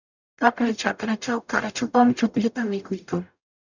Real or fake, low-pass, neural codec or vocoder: fake; 7.2 kHz; codec, 44.1 kHz, 0.9 kbps, DAC